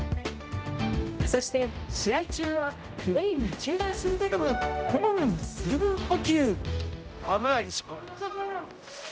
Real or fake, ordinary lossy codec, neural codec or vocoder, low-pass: fake; none; codec, 16 kHz, 0.5 kbps, X-Codec, HuBERT features, trained on general audio; none